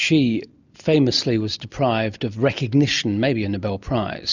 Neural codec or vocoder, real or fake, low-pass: none; real; 7.2 kHz